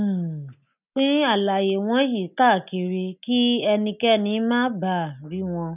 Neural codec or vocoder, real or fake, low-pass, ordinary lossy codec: none; real; 3.6 kHz; none